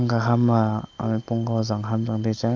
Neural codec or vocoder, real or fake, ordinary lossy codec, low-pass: none; real; Opus, 32 kbps; 7.2 kHz